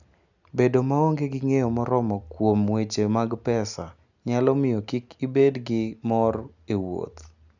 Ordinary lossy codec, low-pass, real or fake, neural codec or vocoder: none; 7.2 kHz; real; none